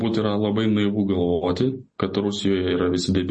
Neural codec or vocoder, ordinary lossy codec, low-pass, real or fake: none; MP3, 32 kbps; 9.9 kHz; real